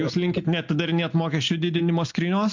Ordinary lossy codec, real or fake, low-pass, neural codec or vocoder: MP3, 48 kbps; real; 7.2 kHz; none